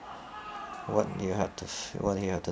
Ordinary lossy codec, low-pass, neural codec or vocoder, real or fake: none; none; none; real